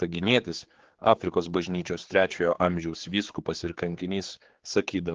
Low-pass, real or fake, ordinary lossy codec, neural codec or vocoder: 7.2 kHz; fake; Opus, 16 kbps; codec, 16 kHz, 4 kbps, X-Codec, HuBERT features, trained on general audio